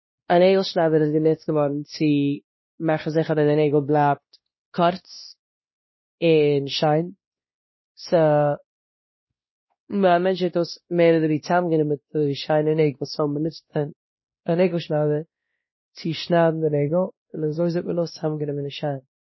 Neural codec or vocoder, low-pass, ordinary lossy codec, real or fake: codec, 16 kHz, 1 kbps, X-Codec, WavLM features, trained on Multilingual LibriSpeech; 7.2 kHz; MP3, 24 kbps; fake